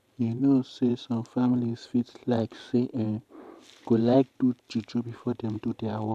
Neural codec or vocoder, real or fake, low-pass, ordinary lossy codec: vocoder, 44.1 kHz, 128 mel bands every 512 samples, BigVGAN v2; fake; 14.4 kHz; none